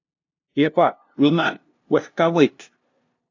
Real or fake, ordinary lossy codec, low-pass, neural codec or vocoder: fake; AAC, 48 kbps; 7.2 kHz; codec, 16 kHz, 0.5 kbps, FunCodec, trained on LibriTTS, 25 frames a second